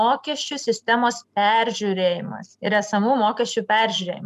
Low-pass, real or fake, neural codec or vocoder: 14.4 kHz; real; none